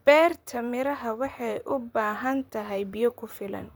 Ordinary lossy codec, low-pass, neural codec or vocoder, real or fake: none; none; vocoder, 44.1 kHz, 128 mel bands, Pupu-Vocoder; fake